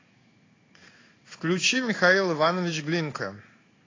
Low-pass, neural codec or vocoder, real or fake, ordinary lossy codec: 7.2 kHz; codec, 16 kHz in and 24 kHz out, 1 kbps, XY-Tokenizer; fake; AAC, 32 kbps